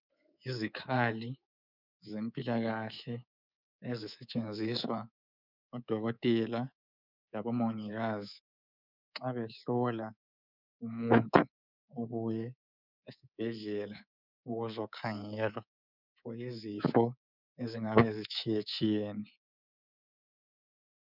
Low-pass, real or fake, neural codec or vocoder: 5.4 kHz; fake; codec, 24 kHz, 3.1 kbps, DualCodec